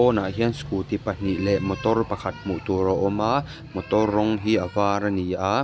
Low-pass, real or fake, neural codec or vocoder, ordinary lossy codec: none; real; none; none